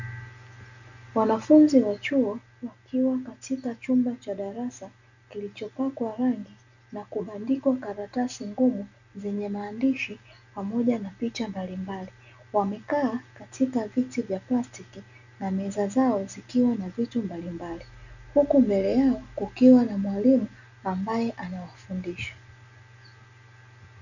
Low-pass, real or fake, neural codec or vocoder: 7.2 kHz; real; none